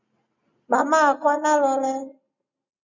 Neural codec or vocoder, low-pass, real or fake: none; 7.2 kHz; real